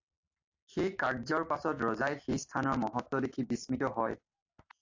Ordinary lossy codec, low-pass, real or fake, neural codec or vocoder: AAC, 48 kbps; 7.2 kHz; real; none